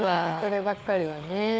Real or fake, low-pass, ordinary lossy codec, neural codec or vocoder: fake; none; none; codec, 16 kHz, 4 kbps, FunCodec, trained on LibriTTS, 50 frames a second